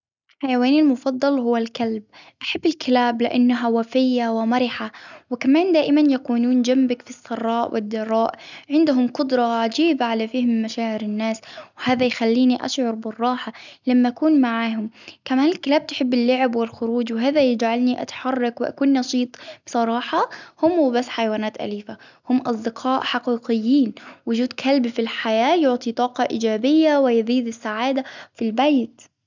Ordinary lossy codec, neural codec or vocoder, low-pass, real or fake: none; none; 7.2 kHz; real